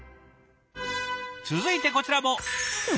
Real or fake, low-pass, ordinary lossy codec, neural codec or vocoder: real; none; none; none